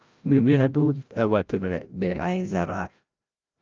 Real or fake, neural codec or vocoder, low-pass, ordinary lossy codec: fake; codec, 16 kHz, 0.5 kbps, FreqCodec, larger model; 7.2 kHz; Opus, 24 kbps